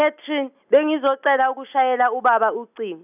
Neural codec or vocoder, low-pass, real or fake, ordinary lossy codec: none; 3.6 kHz; real; none